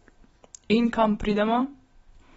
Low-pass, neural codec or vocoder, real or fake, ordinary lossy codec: 19.8 kHz; vocoder, 44.1 kHz, 128 mel bands every 256 samples, BigVGAN v2; fake; AAC, 24 kbps